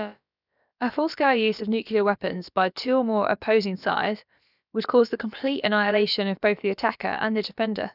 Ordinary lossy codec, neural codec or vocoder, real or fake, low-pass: none; codec, 16 kHz, about 1 kbps, DyCAST, with the encoder's durations; fake; 5.4 kHz